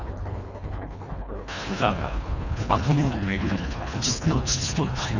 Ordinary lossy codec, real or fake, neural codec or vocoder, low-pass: none; fake; codec, 24 kHz, 1.5 kbps, HILCodec; 7.2 kHz